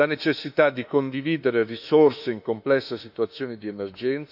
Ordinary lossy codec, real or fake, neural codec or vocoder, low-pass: none; fake; autoencoder, 48 kHz, 32 numbers a frame, DAC-VAE, trained on Japanese speech; 5.4 kHz